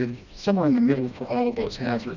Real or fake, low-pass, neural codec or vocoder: fake; 7.2 kHz; codec, 16 kHz, 1 kbps, FreqCodec, smaller model